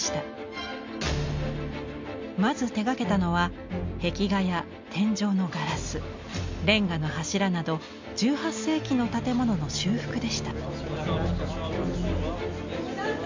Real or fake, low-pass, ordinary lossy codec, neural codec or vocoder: real; 7.2 kHz; MP3, 64 kbps; none